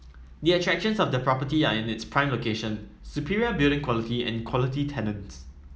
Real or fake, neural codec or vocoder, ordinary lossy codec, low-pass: real; none; none; none